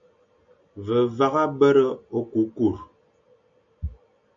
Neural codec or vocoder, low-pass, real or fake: none; 7.2 kHz; real